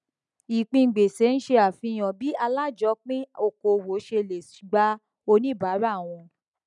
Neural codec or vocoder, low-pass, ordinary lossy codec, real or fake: none; 10.8 kHz; none; real